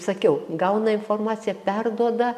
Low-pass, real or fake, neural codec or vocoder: 14.4 kHz; real; none